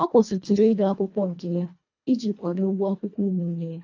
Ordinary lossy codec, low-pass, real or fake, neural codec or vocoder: AAC, 48 kbps; 7.2 kHz; fake; codec, 24 kHz, 1.5 kbps, HILCodec